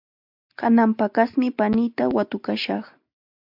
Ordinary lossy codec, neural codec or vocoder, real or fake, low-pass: MP3, 48 kbps; none; real; 5.4 kHz